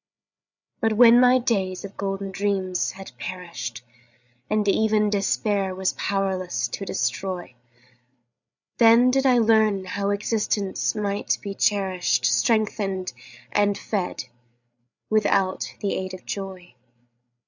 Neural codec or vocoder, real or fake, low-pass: codec, 16 kHz, 8 kbps, FreqCodec, larger model; fake; 7.2 kHz